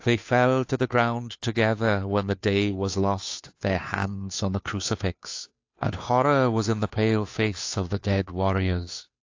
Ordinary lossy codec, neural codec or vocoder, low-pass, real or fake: AAC, 48 kbps; codec, 16 kHz, 2 kbps, FunCodec, trained on Chinese and English, 25 frames a second; 7.2 kHz; fake